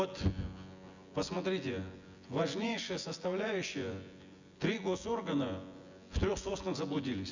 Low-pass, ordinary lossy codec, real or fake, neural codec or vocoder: 7.2 kHz; Opus, 64 kbps; fake; vocoder, 24 kHz, 100 mel bands, Vocos